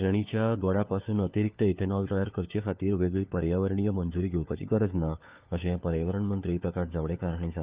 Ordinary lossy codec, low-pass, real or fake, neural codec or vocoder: Opus, 24 kbps; 3.6 kHz; fake; codec, 16 kHz, 4 kbps, FunCodec, trained on LibriTTS, 50 frames a second